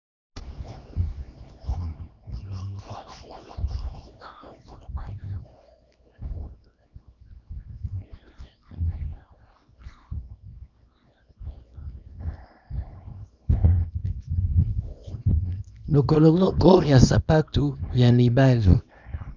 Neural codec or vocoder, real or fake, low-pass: codec, 24 kHz, 0.9 kbps, WavTokenizer, small release; fake; 7.2 kHz